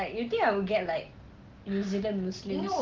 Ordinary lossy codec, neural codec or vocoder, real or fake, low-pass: Opus, 16 kbps; none; real; 7.2 kHz